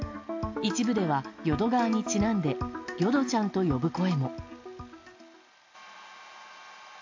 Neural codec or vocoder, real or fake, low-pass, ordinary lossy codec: none; real; 7.2 kHz; AAC, 48 kbps